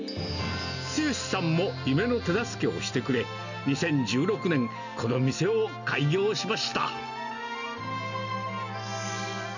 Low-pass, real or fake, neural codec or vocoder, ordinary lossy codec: 7.2 kHz; real; none; none